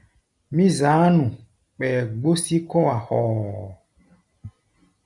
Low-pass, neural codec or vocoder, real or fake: 10.8 kHz; none; real